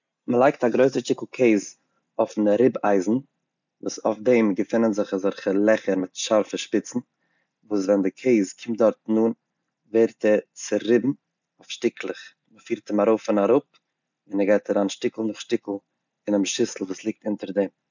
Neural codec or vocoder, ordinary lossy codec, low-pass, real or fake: none; none; 7.2 kHz; real